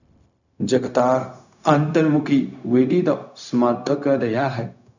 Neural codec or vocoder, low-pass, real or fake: codec, 16 kHz, 0.4 kbps, LongCat-Audio-Codec; 7.2 kHz; fake